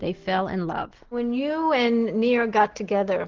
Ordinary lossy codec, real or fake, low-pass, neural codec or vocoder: Opus, 16 kbps; real; 7.2 kHz; none